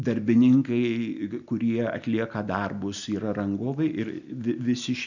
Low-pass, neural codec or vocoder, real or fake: 7.2 kHz; none; real